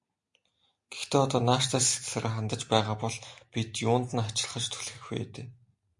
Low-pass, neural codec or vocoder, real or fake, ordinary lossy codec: 10.8 kHz; none; real; MP3, 64 kbps